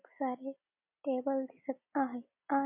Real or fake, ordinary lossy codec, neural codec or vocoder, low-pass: real; MP3, 32 kbps; none; 3.6 kHz